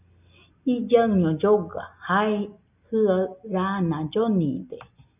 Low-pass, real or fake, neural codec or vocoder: 3.6 kHz; real; none